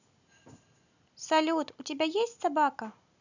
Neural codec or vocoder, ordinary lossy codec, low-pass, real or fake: none; none; 7.2 kHz; real